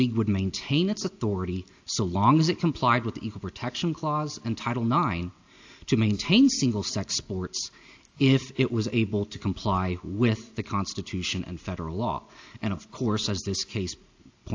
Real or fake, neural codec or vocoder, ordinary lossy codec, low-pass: real; none; AAC, 48 kbps; 7.2 kHz